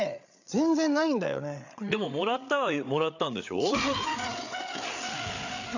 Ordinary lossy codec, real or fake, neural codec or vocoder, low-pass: none; fake; codec, 16 kHz, 8 kbps, FreqCodec, larger model; 7.2 kHz